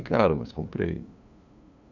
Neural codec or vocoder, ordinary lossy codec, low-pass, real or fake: codec, 16 kHz, 2 kbps, FunCodec, trained on LibriTTS, 25 frames a second; none; 7.2 kHz; fake